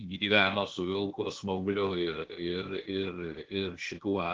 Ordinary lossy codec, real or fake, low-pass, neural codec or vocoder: Opus, 16 kbps; fake; 7.2 kHz; codec, 16 kHz, 0.8 kbps, ZipCodec